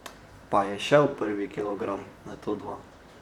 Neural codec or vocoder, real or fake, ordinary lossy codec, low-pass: vocoder, 44.1 kHz, 128 mel bands, Pupu-Vocoder; fake; none; 19.8 kHz